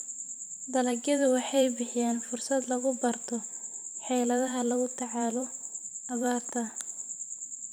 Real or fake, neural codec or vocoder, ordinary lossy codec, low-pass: fake; vocoder, 44.1 kHz, 128 mel bands every 512 samples, BigVGAN v2; none; none